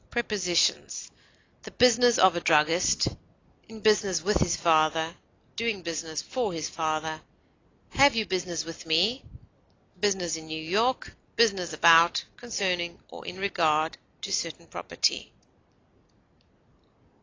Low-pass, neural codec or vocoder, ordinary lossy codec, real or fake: 7.2 kHz; none; AAC, 32 kbps; real